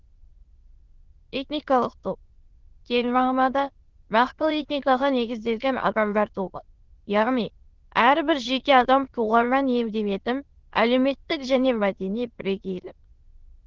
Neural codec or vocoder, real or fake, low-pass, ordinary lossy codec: autoencoder, 22.05 kHz, a latent of 192 numbers a frame, VITS, trained on many speakers; fake; 7.2 kHz; Opus, 16 kbps